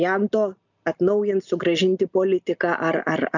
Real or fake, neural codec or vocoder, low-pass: real; none; 7.2 kHz